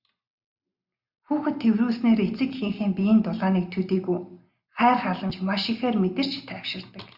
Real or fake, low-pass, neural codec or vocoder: real; 5.4 kHz; none